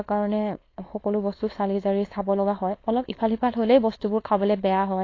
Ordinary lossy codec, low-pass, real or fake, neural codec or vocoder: AAC, 32 kbps; 7.2 kHz; fake; codec, 16 kHz, 4.8 kbps, FACodec